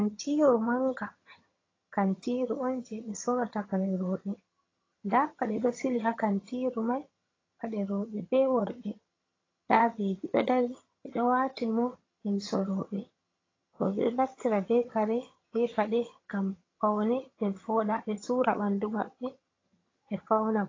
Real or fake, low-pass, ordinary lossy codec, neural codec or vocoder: fake; 7.2 kHz; AAC, 32 kbps; vocoder, 22.05 kHz, 80 mel bands, HiFi-GAN